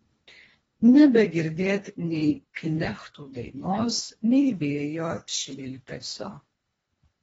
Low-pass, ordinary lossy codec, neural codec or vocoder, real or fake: 10.8 kHz; AAC, 24 kbps; codec, 24 kHz, 1.5 kbps, HILCodec; fake